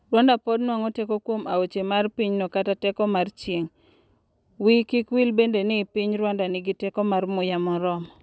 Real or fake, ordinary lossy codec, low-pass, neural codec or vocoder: real; none; none; none